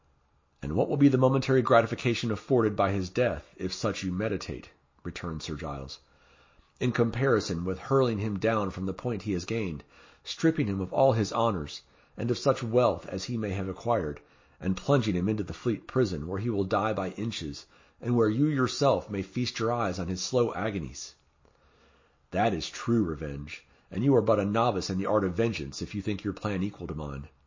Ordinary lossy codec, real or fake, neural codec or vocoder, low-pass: MP3, 32 kbps; real; none; 7.2 kHz